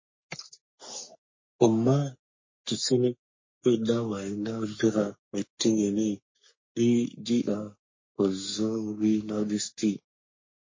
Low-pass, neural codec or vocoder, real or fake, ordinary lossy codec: 7.2 kHz; codec, 44.1 kHz, 3.4 kbps, Pupu-Codec; fake; MP3, 32 kbps